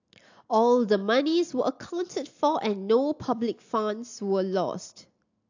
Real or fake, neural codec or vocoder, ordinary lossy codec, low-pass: real; none; AAC, 48 kbps; 7.2 kHz